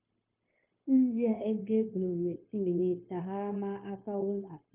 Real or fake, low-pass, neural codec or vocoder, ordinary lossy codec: fake; 3.6 kHz; codec, 16 kHz, 0.9 kbps, LongCat-Audio-Codec; Opus, 24 kbps